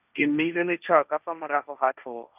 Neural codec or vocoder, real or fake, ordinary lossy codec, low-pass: codec, 16 kHz, 1.1 kbps, Voila-Tokenizer; fake; none; 3.6 kHz